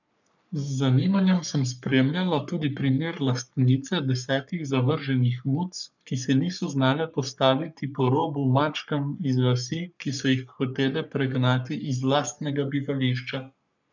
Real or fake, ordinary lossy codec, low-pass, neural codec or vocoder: fake; none; 7.2 kHz; codec, 44.1 kHz, 3.4 kbps, Pupu-Codec